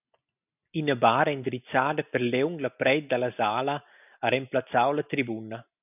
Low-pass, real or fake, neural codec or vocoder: 3.6 kHz; real; none